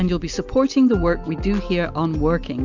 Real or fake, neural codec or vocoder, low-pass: real; none; 7.2 kHz